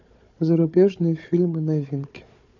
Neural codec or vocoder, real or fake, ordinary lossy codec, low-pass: codec, 16 kHz, 4 kbps, FunCodec, trained on Chinese and English, 50 frames a second; fake; none; 7.2 kHz